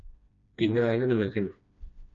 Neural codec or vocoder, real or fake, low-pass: codec, 16 kHz, 1 kbps, FreqCodec, smaller model; fake; 7.2 kHz